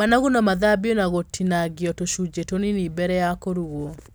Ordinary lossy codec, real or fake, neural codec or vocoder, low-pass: none; real; none; none